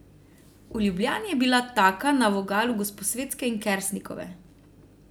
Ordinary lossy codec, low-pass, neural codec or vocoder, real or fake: none; none; none; real